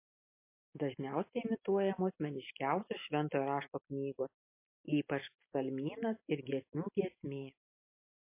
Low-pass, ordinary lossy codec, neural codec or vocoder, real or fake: 3.6 kHz; MP3, 24 kbps; none; real